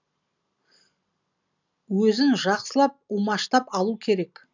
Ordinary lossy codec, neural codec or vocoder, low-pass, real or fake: none; none; 7.2 kHz; real